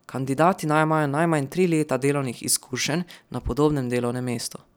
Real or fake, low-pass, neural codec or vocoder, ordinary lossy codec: real; none; none; none